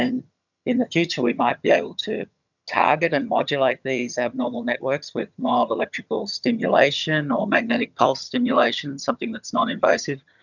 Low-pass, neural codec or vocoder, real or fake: 7.2 kHz; vocoder, 22.05 kHz, 80 mel bands, HiFi-GAN; fake